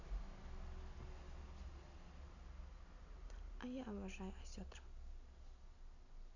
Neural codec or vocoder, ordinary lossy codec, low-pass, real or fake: none; none; 7.2 kHz; real